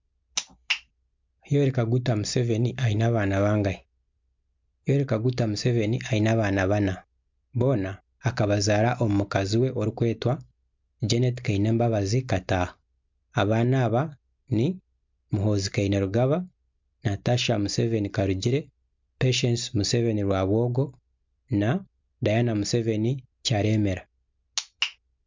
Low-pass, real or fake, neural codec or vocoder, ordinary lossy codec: 7.2 kHz; real; none; MP3, 64 kbps